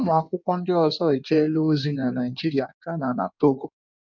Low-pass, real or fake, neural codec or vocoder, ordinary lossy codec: 7.2 kHz; fake; codec, 16 kHz in and 24 kHz out, 1.1 kbps, FireRedTTS-2 codec; none